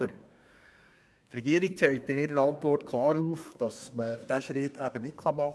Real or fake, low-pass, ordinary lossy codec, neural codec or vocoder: fake; none; none; codec, 24 kHz, 1 kbps, SNAC